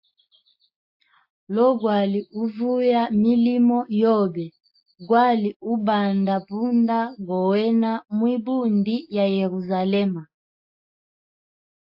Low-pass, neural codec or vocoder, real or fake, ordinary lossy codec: 5.4 kHz; none; real; AAC, 48 kbps